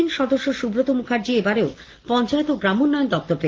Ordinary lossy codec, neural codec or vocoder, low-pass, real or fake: Opus, 16 kbps; none; 7.2 kHz; real